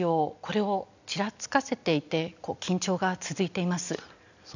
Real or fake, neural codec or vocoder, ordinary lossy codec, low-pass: real; none; none; 7.2 kHz